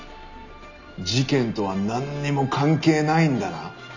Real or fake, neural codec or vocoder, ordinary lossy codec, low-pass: real; none; none; 7.2 kHz